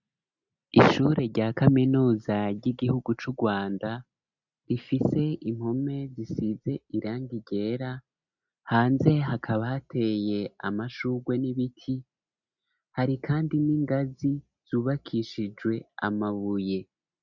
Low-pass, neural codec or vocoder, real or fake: 7.2 kHz; none; real